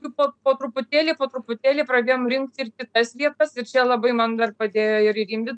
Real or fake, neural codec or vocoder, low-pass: real; none; 9.9 kHz